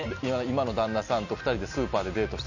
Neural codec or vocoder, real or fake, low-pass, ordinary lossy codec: none; real; 7.2 kHz; none